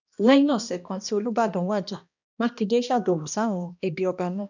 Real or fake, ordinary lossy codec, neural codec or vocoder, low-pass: fake; none; codec, 16 kHz, 1 kbps, X-Codec, HuBERT features, trained on balanced general audio; 7.2 kHz